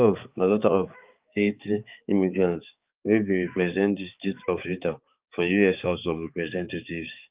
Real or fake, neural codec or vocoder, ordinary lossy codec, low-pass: fake; codec, 16 kHz, 4 kbps, X-Codec, HuBERT features, trained on balanced general audio; Opus, 24 kbps; 3.6 kHz